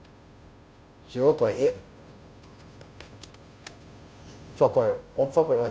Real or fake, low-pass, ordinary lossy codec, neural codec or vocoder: fake; none; none; codec, 16 kHz, 0.5 kbps, FunCodec, trained on Chinese and English, 25 frames a second